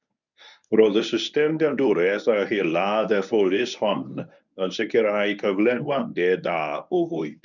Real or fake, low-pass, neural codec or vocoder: fake; 7.2 kHz; codec, 24 kHz, 0.9 kbps, WavTokenizer, medium speech release version 1